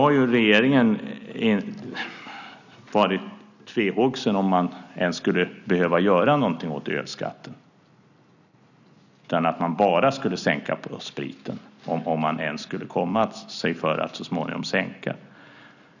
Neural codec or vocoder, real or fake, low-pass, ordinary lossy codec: none; real; 7.2 kHz; none